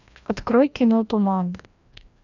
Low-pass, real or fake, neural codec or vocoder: 7.2 kHz; fake; codec, 16 kHz, 1 kbps, FreqCodec, larger model